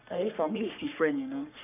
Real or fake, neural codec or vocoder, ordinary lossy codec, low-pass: fake; codec, 44.1 kHz, 3.4 kbps, Pupu-Codec; none; 3.6 kHz